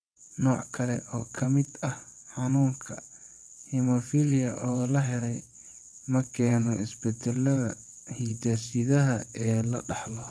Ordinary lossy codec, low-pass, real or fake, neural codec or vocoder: none; none; fake; vocoder, 22.05 kHz, 80 mel bands, WaveNeXt